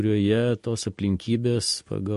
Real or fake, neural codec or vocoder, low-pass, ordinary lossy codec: real; none; 14.4 kHz; MP3, 48 kbps